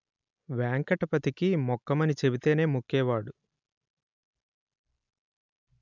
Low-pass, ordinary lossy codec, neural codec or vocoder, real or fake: 7.2 kHz; none; none; real